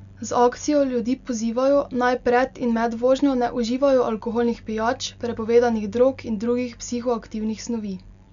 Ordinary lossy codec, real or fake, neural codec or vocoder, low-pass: none; real; none; 7.2 kHz